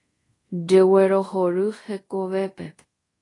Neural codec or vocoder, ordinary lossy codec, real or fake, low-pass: codec, 24 kHz, 0.5 kbps, DualCodec; AAC, 32 kbps; fake; 10.8 kHz